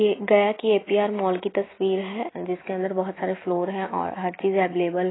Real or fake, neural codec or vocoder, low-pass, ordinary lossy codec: real; none; 7.2 kHz; AAC, 16 kbps